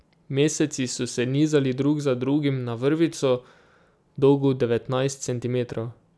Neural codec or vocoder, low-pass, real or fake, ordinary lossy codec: none; none; real; none